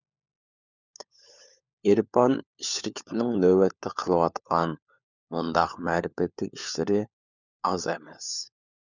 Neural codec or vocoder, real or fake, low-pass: codec, 16 kHz, 4 kbps, FunCodec, trained on LibriTTS, 50 frames a second; fake; 7.2 kHz